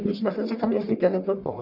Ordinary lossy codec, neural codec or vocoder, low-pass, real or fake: none; codec, 44.1 kHz, 1.7 kbps, Pupu-Codec; 5.4 kHz; fake